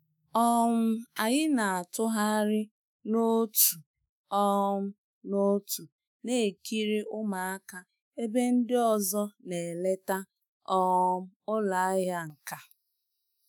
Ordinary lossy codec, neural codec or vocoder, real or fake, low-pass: none; autoencoder, 48 kHz, 128 numbers a frame, DAC-VAE, trained on Japanese speech; fake; none